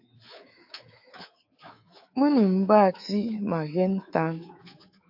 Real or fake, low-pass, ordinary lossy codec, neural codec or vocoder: fake; 5.4 kHz; AAC, 48 kbps; codec, 24 kHz, 3.1 kbps, DualCodec